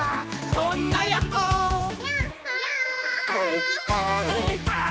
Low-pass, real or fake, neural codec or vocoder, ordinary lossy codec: none; fake; codec, 16 kHz, 2 kbps, X-Codec, HuBERT features, trained on general audio; none